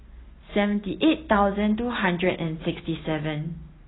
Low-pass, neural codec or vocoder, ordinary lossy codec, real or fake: 7.2 kHz; none; AAC, 16 kbps; real